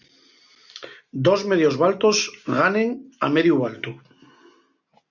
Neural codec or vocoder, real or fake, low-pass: none; real; 7.2 kHz